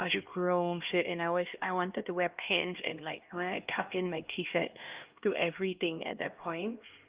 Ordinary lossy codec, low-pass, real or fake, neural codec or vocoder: Opus, 32 kbps; 3.6 kHz; fake; codec, 16 kHz, 1 kbps, X-Codec, HuBERT features, trained on LibriSpeech